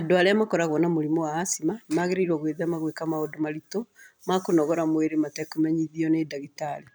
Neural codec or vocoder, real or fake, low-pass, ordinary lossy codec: none; real; none; none